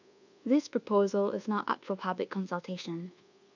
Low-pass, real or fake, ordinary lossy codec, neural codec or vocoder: 7.2 kHz; fake; none; codec, 24 kHz, 1.2 kbps, DualCodec